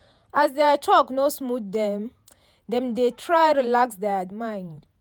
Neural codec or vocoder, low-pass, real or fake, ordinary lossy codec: vocoder, 48 kHz, 128 mel bands, Vocos; none; fake; none